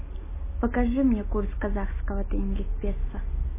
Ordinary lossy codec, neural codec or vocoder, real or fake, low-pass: MP3, 16 kbps; none; real; 3.6 kHz